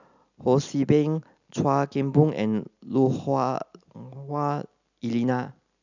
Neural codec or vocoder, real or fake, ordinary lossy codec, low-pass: none; real; none; 7.2 kHz